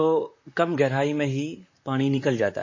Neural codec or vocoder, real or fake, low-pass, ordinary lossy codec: vocoder, 44.1 kHz, 128 mel bands, Pupu-Vocoder; fake; 7.2 kHz; MP3, 32 kbps